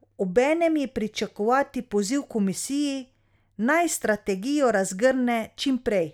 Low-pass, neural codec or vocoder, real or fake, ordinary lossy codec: 19.8 kHz; none; real; none